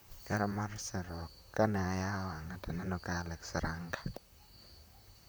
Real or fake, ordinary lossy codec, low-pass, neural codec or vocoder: fake; none; none; vocoder, 44.1 kHz, 128 mel bands, Pupu-Vocoder